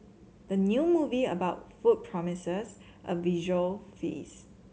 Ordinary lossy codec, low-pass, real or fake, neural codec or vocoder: none; none; real; none